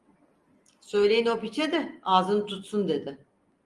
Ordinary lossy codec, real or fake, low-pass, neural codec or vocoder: Opus, 32 kbps; real; 10.8 kHz; none